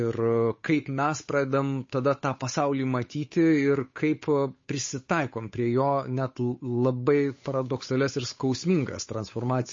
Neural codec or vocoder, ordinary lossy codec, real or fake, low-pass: codec, 16 kHz, 8 kbps, FunCodec, trained on Chinese and English, 25 frames a second; MP3, 32 kbps; fake; 7.2 kHz